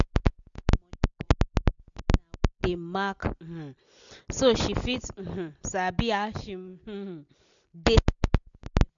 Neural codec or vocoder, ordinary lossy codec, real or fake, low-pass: none; none; real; 7.2 kHz